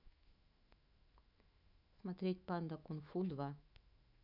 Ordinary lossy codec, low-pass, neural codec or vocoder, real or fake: none; 5.4 kHz; autoencoder, 48 kHz, 128 numbers a frame, DAC-VAE, trained on Japanese speech; fake